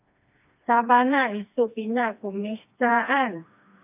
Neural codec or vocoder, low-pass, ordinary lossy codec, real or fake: codec, 16 kHz, 2 kbps, FreqCodec, smaller model; 3.6 kHz; AAC, 32 kbps; fake